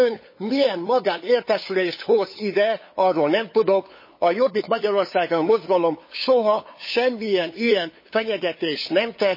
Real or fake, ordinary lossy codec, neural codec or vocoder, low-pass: fake; MP3, 24 kbps; codec, 16 kHz, 8 kbps, FunCodec, trained on LibriTTS, 25 frames a second; 5.4 kHz